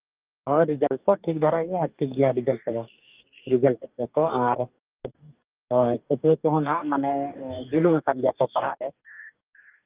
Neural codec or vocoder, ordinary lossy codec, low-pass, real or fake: codec, 44.1 kHz, 2.6 kbps, DAC; Opus, 32 kbps; 3.6 kHz; fake